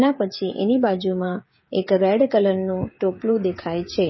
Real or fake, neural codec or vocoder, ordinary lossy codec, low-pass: fake; codec, 16 kHz, 16 kbps, FreqCodec, smaller model; MP3, 24 kbps; 7.2 kHz